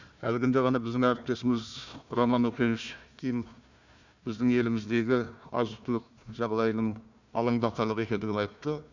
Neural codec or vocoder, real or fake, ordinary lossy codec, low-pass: codec, 16 kHz, 1 kbps, FunCodec, trained on Chinese and English, 50 frames a second; fake; none; 7.2 kHz